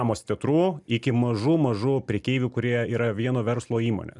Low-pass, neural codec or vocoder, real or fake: 10.8 kHz; none; real